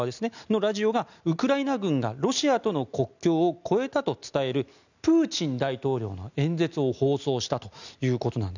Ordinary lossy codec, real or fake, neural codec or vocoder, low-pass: none; real; none; 7.2 kHz